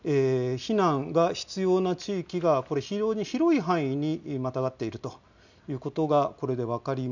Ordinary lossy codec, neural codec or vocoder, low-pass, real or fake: none; none; 7.2 kHz; real